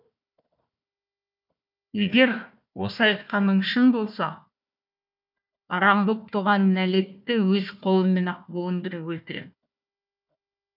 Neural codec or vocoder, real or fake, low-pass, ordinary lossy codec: codec, 16 kHz, 1 kbps, FunCodec, trained on Chinese and English, 50 frames a second; fake; 5.4 kHz; none